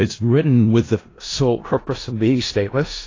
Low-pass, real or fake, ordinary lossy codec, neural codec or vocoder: 7.2 kHz; fake; AAC, 32 kbps; codec, 16 kHz in and 24 kHz out, 0.4 kbps, LongCat-Audio-Codec, four codebook decoder